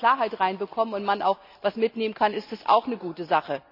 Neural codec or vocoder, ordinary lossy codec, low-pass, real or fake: none; none; 5.4 kHz; real